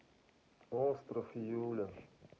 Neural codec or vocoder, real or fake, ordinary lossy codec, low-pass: none; real; none; none